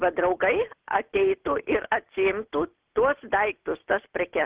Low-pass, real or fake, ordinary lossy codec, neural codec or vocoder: 3.6 kHz; real; Opus, 16 kbps; none